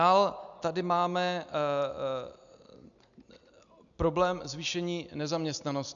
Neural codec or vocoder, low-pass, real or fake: none; 7.2 kHz; real